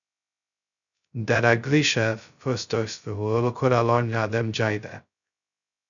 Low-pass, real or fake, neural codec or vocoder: 7.2 kHz; fake; codec, 16 kHz, 0.2 kbps, FocalCodec